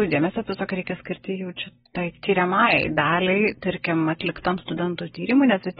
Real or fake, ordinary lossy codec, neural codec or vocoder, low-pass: real; AAC, 16 kbps; none; 19.8 kHz